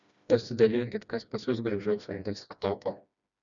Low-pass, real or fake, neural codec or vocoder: 7.2 kHz; fake; codec, 16 kHz, 1 kbps, FreqCodec, smaller model